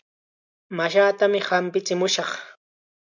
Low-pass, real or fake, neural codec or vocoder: 7.2 kHz; real; none